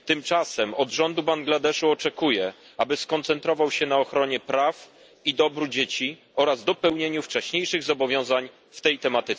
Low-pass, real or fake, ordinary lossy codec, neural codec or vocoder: none; real; none; none